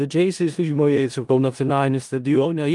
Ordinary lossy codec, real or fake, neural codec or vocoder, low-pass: Opus, 32 kbps; fake; codec, 16 kHz in and 24 kHz out, 0.4 kbps, LongCat-Audio-Codec, four codebook decoder; 10.8 kHz